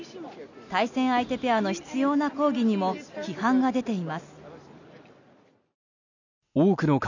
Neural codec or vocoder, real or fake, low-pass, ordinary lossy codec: none; real; 7.2 kHz; none